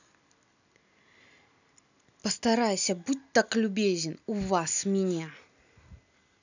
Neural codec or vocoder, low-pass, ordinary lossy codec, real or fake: none; 7.2 kHz; none; real